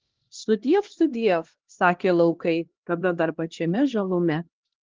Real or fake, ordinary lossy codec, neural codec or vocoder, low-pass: fake; Opus, 16 kbps; codec, 16 kHz, 1 kbps, X-Codec, HuBERT features, trained on LibriSpeech; 7.2 kHz